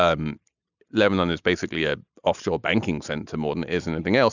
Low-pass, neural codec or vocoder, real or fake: 7.2 kHz; none; real